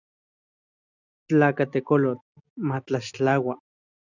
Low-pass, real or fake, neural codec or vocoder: 7.2 kHz; real; none